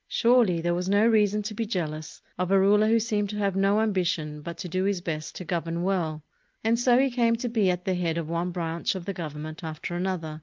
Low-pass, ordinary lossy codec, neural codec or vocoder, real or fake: 7.2 kHz; Opus, 32 kbps; none; real